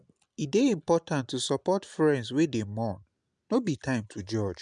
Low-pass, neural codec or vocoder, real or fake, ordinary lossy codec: 9.9 kHz; none; real; none